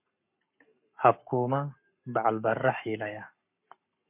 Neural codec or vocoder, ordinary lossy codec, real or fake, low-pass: codec, 44.1 kHz, 7.8 kbps, Pupu-Codec; MP3, 32 kbps; fake; 3.6 kHz